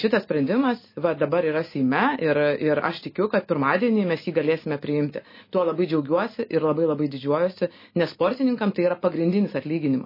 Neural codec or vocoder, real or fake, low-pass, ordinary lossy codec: none; real; 5.4 kHz; MP3, 24 kbps